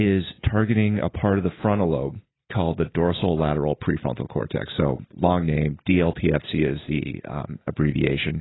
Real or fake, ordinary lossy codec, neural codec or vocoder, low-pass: real; AAC, 16 kbps; none; 7.2 kHz